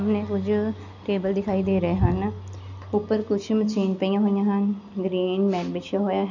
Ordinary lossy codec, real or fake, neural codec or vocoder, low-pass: none; real; none; 7.2 kHz